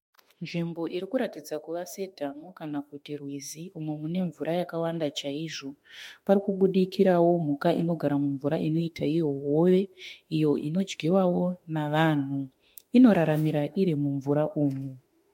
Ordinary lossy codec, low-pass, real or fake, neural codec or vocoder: MP3, 64 kbps; 19.8 kHz; fake; autoencoder, 48 kHz, 32 numbers a frame, DAC-VAE, trained on Japanese speech